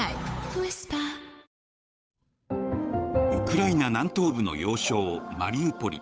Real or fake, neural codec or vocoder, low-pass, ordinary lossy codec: fake; codec, 16 kHz, 8 kbps, FunCodec, trained on Chinese and English, 25 frames a second; none; none